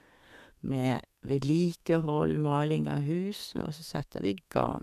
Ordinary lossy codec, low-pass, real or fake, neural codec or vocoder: none; 14.4 kHz; fake; codec, 32 kHz, 1.9 kbps, SNAC